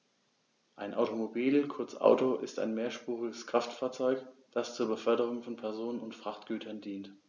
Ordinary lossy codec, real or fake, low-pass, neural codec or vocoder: none; real; 7.2 kHz; none